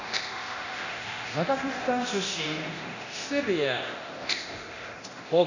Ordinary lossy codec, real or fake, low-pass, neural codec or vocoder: none; fake; 7.2 kHz; codec, 24 kHz, 0.9 kbps, DualCodec